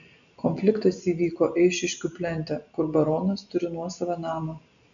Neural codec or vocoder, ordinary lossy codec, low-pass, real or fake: none; MP3, 96 kbps; 7.2 kHz; real